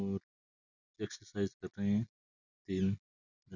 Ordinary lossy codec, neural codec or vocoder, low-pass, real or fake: none; none; 7.2 kHz; real